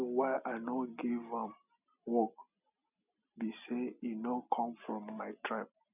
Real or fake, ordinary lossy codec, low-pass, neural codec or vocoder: real; none; 3.6 kHz; none